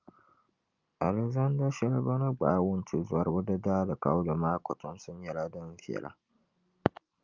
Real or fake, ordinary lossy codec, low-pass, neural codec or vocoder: real; Opus, 24 kbps; 7.2 kHz; none